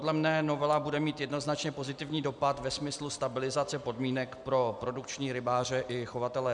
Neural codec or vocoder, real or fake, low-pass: none; real; 10.8 kHz